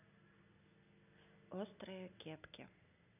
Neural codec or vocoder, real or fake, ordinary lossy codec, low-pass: none; real; none; 3.6 kHz